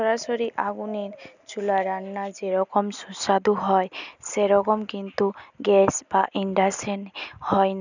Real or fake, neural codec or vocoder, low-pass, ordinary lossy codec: real; none; 7.2 kHz; none